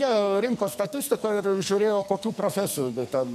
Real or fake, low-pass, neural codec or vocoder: fake; 14.4 kHz; codec, 44.1 kHz, 2.6 kbps, SNAC